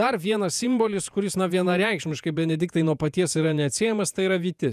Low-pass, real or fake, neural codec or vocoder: 14.4 kHz; fake; vocoder, 48 kHz, 128 mel bands, Vocos